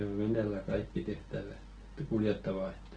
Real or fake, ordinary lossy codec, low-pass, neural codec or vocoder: real; Opus, 16 kbps; 9.9 kHz; none